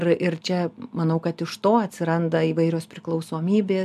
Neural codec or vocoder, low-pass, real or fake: none; 14.4 kHz; real